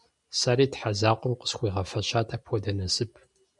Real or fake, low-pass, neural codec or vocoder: real; 10.8 kHz; none